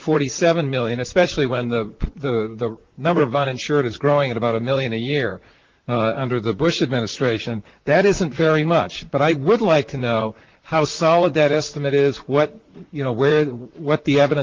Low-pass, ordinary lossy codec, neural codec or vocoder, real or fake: 7.2 kHz; Opus, 16 kbps; vocoder, 44.1 kHz, 128 mel bands, Pupu-Vocoder; fake